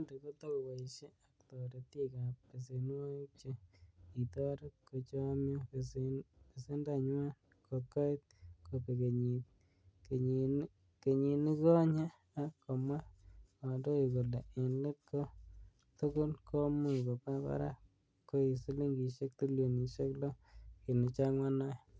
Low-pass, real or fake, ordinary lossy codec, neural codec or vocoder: none; real; none; none